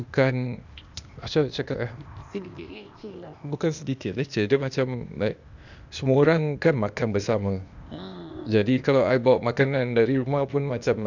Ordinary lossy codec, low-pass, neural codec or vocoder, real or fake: none; 7.2 kHz; codec, 16 kHz, 0.8 kbps, ZipCodec; fake